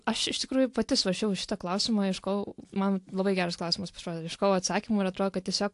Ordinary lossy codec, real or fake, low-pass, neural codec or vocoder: AAC, 64 kbps; real; 10.8 kHz; none